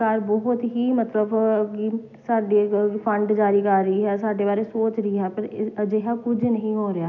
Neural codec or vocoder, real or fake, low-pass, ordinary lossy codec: none; real; 7.2 kHz; none